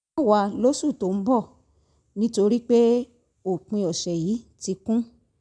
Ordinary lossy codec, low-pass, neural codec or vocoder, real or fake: none; 9.9 kHz; none; real